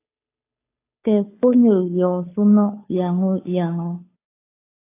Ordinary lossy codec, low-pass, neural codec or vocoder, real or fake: AAC, 24 kbps; 3.6 kHz; codec, 16 kHz, 2 kbps, FunCodec, trained on Chinese and English, 25 frames a second; fake